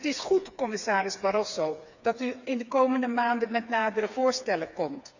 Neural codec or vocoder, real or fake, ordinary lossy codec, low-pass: codec, 16 kHz, 4 kbps, FreqCodec, smaller model; fake; none; 7.2 kHz